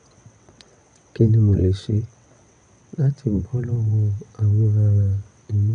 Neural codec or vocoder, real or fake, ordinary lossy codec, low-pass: vocoder, 22.05 kHz, 80 mel bands, WaveNeXt; fake; none; 9.9 kHz